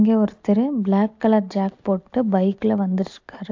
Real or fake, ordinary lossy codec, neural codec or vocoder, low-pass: real; AAC, 48 kbps; none; 7.2 kHz